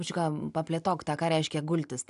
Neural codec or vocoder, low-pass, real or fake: none; 10.8 kHz; real